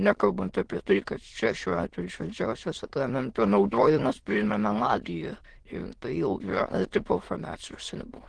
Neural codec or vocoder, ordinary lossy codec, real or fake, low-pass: autoencoder, 22.05 kHz, a latent of 192 numbers a frame, VITS, trained on many speakers; Opus, 16 kbps; fake; 9.9 kHz